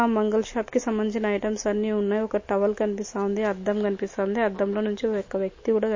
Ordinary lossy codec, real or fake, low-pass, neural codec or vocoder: MP3, 32 kbps; real; 7.2 kHz; none